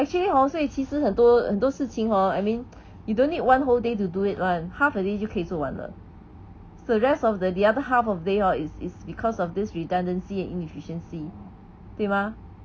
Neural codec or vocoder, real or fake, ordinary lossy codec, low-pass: none; real; none; none